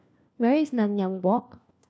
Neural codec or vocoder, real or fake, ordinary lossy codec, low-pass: codec, 16 kHz, 1 kbps, FunCodec, trained on LibriTTS, 50 frames a second; fake; none; none